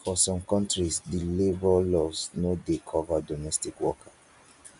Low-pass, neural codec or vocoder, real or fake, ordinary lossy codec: 10.8 kHz; none; real; none